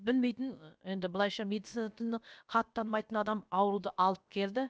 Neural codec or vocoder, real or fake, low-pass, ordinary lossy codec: codec, 16 kHz, about 1 kbps, DyCAST, with the encoder's durations; fake; none; none